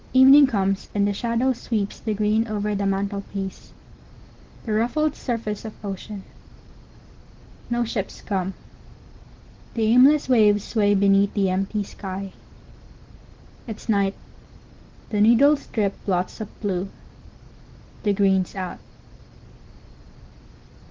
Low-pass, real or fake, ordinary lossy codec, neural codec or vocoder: 7.2 kHz; real; Opus, 16 kbps; none